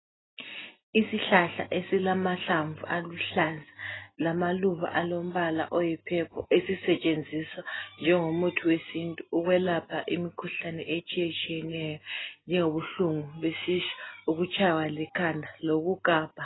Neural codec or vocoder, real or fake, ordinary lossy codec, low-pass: none; real; AAC, 16 kbps; 7.2 kHz